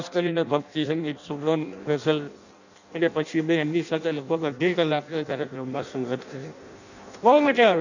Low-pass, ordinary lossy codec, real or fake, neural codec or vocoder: 7.2 kHz; none; fake; codec, 16 kHz in and 24 kHz out, 0.6 kbps, FireRedTTS-2 codec